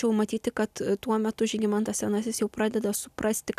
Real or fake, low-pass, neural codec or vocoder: real; 14.4 kHz; none